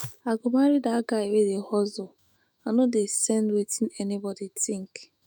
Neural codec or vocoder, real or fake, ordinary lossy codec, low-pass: autoencoder, 48 kHz, 128 numbers a frame, DAC-VAE, trained on Japanese speech; fake; none; none